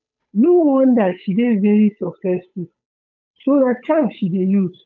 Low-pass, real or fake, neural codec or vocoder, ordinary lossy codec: 7.2 kHz; fake; codec, 16 kHz, 8 kbps, FunCodec, trained on Chinese and English, 25 frames a second; none